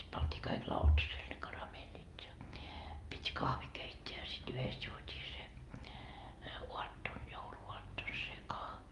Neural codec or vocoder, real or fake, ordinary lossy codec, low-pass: none; real; Opus, 32 kbps; 10.8 kHz